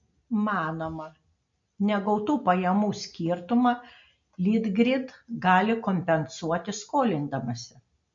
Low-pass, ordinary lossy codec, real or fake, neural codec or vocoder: 7.2 kHz; MP3, 48 kbps; real; none